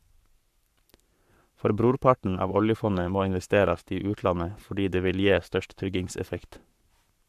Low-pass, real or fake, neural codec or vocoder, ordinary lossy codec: 14.4 kHz; fake; codec, 44.1 kHz, 7.8 kbps, Pupu-Codec; AAC, 96 kbps